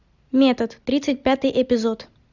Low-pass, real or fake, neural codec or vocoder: 7.2 kHz; real; none